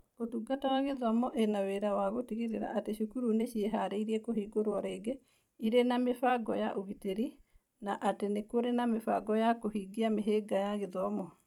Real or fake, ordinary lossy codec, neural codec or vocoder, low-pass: fake; none; vocoder, 44.1 kHz, 128 mel bands every 256 samples, BigVGAN v2; 19.8 kHz